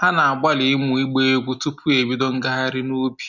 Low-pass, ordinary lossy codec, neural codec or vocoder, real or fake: 7.2 kHz; none; none; real